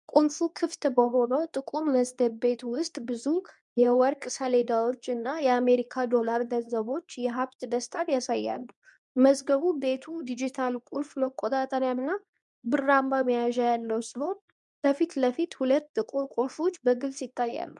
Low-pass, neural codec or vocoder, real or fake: 10.8 kHz; codec, 24 kHz, 0.9 kbps, WavTokenizer, medium speech release version 2; fake